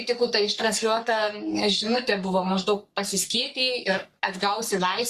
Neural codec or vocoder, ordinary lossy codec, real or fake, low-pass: codec, 44.1 kHz, 3.4 kbps, Pupu-Codec; Opus, 64 kbps; fake; 14.4 kHz